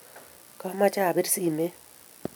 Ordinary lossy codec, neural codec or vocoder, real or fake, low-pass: none; none; real; none